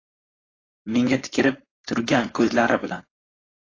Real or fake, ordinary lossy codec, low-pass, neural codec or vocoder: fake; AAC, 32 kbps; 7.2 kHz; codec, 16 kHz, 4.8 kbps, FACodec